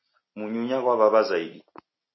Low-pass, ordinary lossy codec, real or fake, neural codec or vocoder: 7.2 kHz; MP3, 24 kbps; real; none